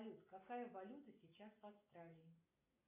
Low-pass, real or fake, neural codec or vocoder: 3.6 kHz; real; none